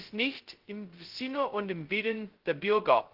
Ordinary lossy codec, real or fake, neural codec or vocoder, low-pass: Opus, 16 kbps; fake; codec, 16 kHz, 0.2 kbps, FocalCodec; 5.4 kHz